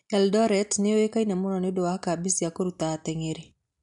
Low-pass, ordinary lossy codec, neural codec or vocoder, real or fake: 10.8 kHz; MP3, 64 kbps; none; real